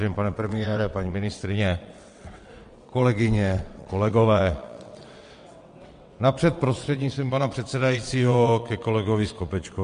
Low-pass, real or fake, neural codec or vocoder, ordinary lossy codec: 9.9 kHz; fake; vocoder, 22.05 kHz, 80 mel bands, WaveNeXt; MP3, 48 kbps